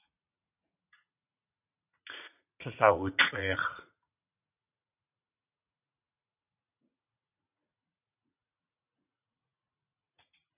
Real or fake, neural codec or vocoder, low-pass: fake; vocoder, 44.1 kHz, 80 mel bands, Vocos; 3.6 kHz